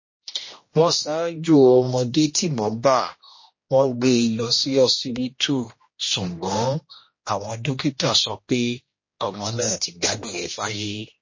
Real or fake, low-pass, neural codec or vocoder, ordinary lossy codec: fake; 7.2 kHz; codec, 16 kHz, 1 kbps, X-Codec, HuBERT features, trained on general audio; MP3, 32 kbps